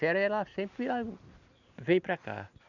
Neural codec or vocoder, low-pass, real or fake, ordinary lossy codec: none; 7.2 kHz; real; none